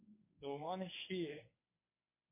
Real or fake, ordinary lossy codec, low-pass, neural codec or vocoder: fake; MP3, 24 kbps; 3.6 kHz; codec, 24 kHz, 0.9 kbps, WavTokenizer, medium speech release version 1